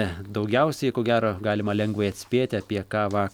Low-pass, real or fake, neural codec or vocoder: 19.8 kHz; real; none